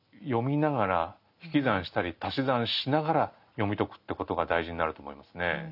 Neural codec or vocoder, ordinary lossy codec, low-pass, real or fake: none; none; 5.4 kHz; real